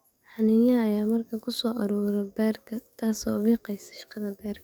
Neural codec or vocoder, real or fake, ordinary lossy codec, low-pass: codec, 44.1 kHz, 7.8 kbps, DAC; fake; none; none